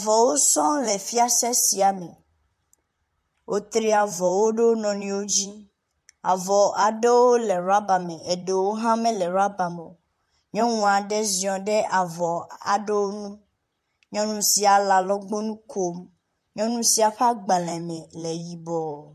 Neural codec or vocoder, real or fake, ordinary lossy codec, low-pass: vocoder, 44.1 kHz, 128 mel bands, Pupu-Vocoder; fake; MP3, 64 kbps; 14.4 kHz